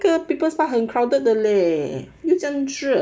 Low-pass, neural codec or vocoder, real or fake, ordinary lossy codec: none; none; real; none